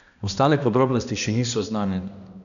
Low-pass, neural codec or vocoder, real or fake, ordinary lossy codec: 7.2 kHz; codec, 16 kHz, 1 kbps, X-Codec, HuBERT features, trained on balanced general audio; fake; none